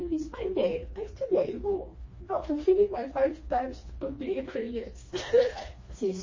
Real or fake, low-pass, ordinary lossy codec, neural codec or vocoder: fake; 7.2 kHz; MP3, 32 kbps; codec, 16 kHz, 2 kbps, FreqCodec, smaller model